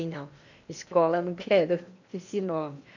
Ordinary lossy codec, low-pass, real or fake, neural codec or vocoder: none; 7.2 kHz; fake; codec, 16 kHz in and 24 kHz out, 0.8 kbps, FocalCodec, streaming, 65536 codes